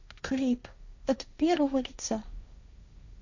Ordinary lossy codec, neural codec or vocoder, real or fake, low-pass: none; codec, 16 kHz, 1.1 kbps, Voila-Tokenizer; fake; 7.2 kHz